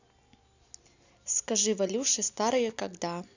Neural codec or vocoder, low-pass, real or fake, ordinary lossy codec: none; 7.2 kHz; real; none